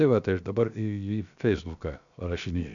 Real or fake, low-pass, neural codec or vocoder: fake; 7.2 kHz; codec, 16 kHz, 0.7 kbps, FocalCodec